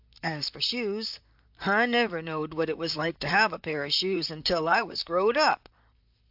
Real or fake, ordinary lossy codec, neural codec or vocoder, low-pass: fake; AAC, 48 kbps; vocoder, 44.1 kHz, 128 mel bands, Pupu-Vocoder; 5.4 kHz